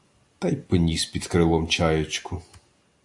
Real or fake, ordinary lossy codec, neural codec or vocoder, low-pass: real; AAC, 64 kbps; none; 10.8 kHz